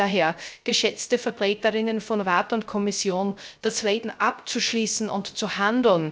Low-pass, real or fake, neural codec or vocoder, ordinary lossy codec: none; fake; codec, 16 kHz, 0.3 kbps, FocalCodec; none